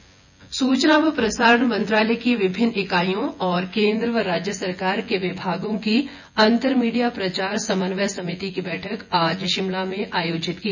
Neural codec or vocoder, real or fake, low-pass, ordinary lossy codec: vocoder, 24 kHz, 100 mel bands, Vocos; fake; 7.2 kHz; none